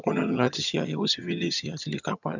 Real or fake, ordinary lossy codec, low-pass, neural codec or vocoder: fake; none; 7.2 kHz; vocoder, 22.05 kHz, 80 mel bands, HiFi-GAN